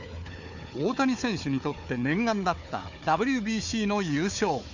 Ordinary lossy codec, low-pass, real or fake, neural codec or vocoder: none; 7.2 kHz; fake; codec, 16 kHz, 4 kbps, FunCodec, trained on Chinese and English, 50 frames a second